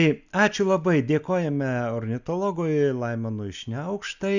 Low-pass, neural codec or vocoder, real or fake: 7.2 kHz; none; real